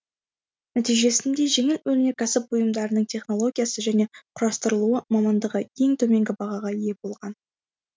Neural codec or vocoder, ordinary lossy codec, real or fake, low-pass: none; none; real; none